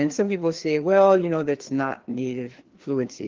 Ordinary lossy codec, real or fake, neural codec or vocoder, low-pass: Opus, 16 kbps; fake; codec, 16 kHz, 2 kbps, FreqCodec, larger model; 7.2 kHz